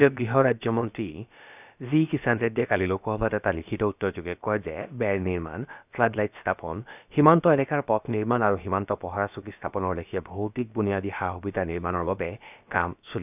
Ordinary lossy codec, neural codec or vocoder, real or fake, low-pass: none; codec, 16 kHz, about 1 kbps, DyCAST, with the encoder's durations; fake; 3.6 kHz